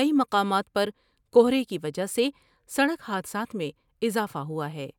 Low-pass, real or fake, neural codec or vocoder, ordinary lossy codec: 19.8 kHz; real; none; none